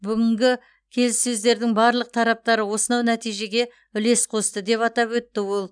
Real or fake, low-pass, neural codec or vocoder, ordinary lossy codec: real; 9.9 kHz; none; none